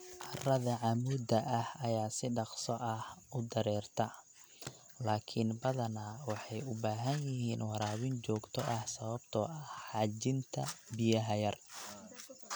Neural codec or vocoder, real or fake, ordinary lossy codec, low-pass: none; real; none; none